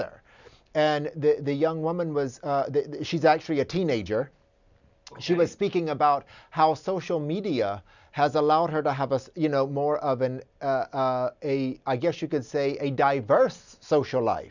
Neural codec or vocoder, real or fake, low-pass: none; real; 7.2 kHz